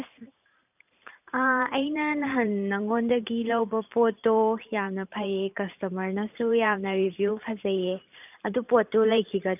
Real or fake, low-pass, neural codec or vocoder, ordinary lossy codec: fake; 3.6 kHz; vocoder, 44.1 kHz, 128 mel bands every 512 samples, BigVGAN v2; none